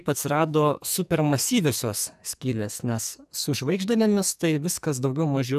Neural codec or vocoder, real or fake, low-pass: codec, 44.1 kHz, 2.6 kbps, SNAC; fake; 14.4 kHz